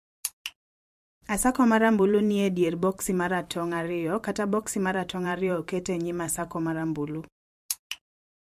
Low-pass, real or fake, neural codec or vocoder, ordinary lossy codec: 14.4 kHz; fake; vocoder, 44.1 kHz, 128 mel bands every 512 samples, BigVGAN v2; MP3, 64 kbps